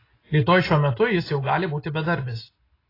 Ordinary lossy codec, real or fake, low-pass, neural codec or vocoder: AAC, 24 kbps; real; 5.4 kHz; none